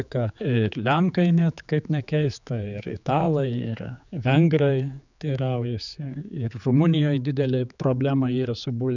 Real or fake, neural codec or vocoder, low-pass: fake; codec, 16 kHz, 4 kbps, X-Codec, HuBERT features, trained on general audio; 7.2 kHz